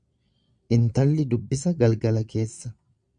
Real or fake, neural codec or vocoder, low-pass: fake; vocoder, 22.05 kHz, 80 mel bands, Vocos; 9.9 kHz